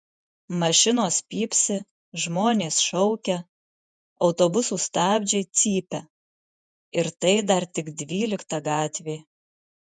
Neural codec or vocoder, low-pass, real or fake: vocoder, 48 kHz, 128 mel bands, Vocos; 9.9 kHz; fake